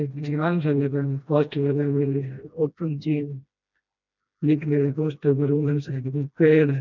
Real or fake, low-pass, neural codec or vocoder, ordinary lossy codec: fake; 7.2 kHz; codec, 16 kHz, 1 kbps, FreqCodec, smaller model; none